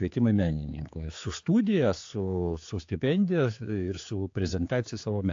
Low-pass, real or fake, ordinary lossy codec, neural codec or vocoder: 7.2 kHz; fake; AAC, 48 kbps; codec, 16 kHz, 4 kbps, X-Codec, HuBERT features, trained on general audio